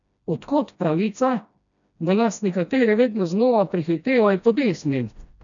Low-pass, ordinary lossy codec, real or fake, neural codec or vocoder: 7.2 kHz; none; fake; codec, 16 kHz, 1 kbps, FreqCodec, smaller model